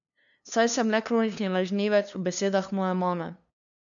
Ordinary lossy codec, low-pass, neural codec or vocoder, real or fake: none; 7.2 kHz; codec, 16 kHz, 2 kbps, FunCodec, trained on LibriTTS, 25 frames a second; fake